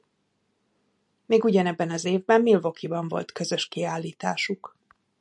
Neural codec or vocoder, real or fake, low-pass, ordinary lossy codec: none; real; 10.8 kHz; MP3, 96 kbps